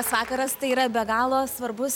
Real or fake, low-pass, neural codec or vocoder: fake; 19.8 kHz; vocoder, 44.1 kHz, 128 mel bands every 256 samples, BigVGAN v2